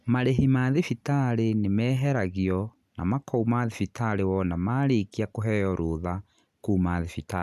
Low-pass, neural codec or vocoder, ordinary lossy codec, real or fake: 14.4 kHz; none; none; real